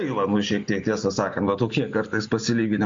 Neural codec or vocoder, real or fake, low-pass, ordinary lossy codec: none; real; 7.2 kHz; MP3, 48 kbps